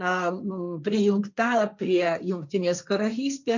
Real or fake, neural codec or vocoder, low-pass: fake; codec, 16 kHz, 1.1 kbps, Voila-Tokenizer; 7.2 kHz